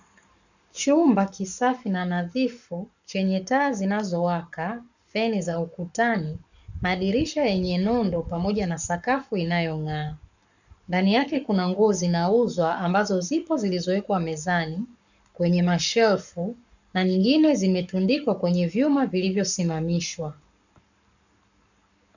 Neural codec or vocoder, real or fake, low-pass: codec, 44.1 kHz, 7.8 kbps, Pupu-Codec; fake; 7.2 kHz